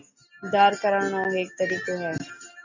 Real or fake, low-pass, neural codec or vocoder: real; 7.2 kHz; none